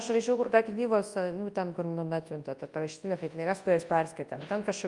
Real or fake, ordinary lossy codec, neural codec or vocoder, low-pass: fake; Opus, 32 kbps; codec, 24 kHz, 0.9 kbps, WavTokenizer, large speech release; 10.8 kHz